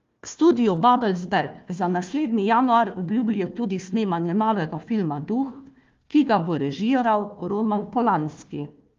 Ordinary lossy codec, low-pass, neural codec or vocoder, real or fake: Opus, 32 kbps; 7.2 kHz; codec, 16 kHz, 1 kbps, FunCodec, trained on Chinese and English, 50 frames a second; fake